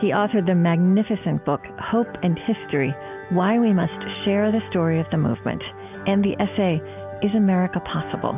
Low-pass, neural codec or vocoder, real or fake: 3.6 kHz; none; real